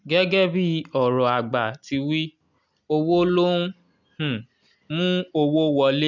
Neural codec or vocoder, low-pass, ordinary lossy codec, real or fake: none; 7.2 kHz; none; real